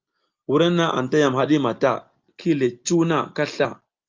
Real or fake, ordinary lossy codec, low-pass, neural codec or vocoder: real; Opus, 24 kbps; 7.2 kHz; none